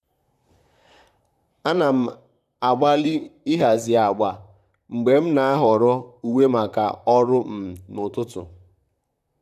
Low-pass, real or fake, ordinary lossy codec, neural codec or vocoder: 14.4 kHz; fake; none; vocoder, 44.1 kHz, 128 mel bands, Pupu-Vocoder